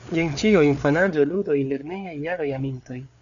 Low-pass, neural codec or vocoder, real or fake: 7.2 kHz; codec, 16 kHz, 4 kbps, FreqCodec, larger model; fake